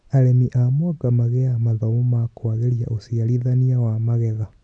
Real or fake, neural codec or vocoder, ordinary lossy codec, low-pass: real; none; MP3, 64 kbps; 9.9 kHz